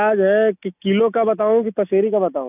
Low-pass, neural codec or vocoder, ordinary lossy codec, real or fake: 3.6 kHz; none; none; real